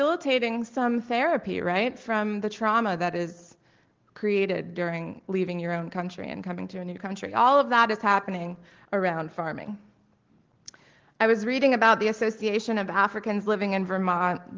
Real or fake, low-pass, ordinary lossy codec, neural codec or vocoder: real; 7.2 kHz; Opus, 16 kbps; none